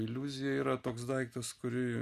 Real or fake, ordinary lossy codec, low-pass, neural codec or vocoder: real; Opus, 64 kbps; 14.4 kHz; none